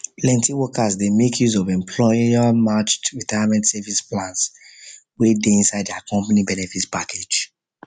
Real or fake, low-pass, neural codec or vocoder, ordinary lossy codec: real; 10.8 kHz; none; none